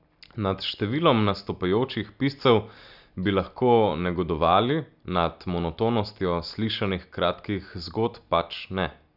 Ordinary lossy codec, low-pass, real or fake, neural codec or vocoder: none; 5.4 kHz; real; none